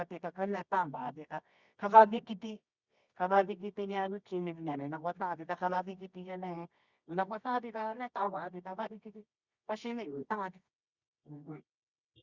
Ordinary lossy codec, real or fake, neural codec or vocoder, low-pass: Opus, 64 kbps; fake; codec, 24 kHz, 0.9 kbps, WavTokenizer, medium music audio release; 7.2 kHz